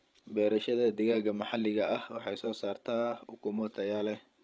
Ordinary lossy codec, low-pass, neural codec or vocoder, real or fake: none; none; codec, 16 kHz, 16 kbps, FreqCodec, larger model; fake